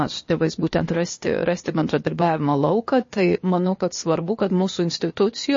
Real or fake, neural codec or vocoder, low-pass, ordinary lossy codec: fake; codec, 16 kHz, 0.8 kbps, ZipCodec; 7.2 kHz; MP3, 32 kbps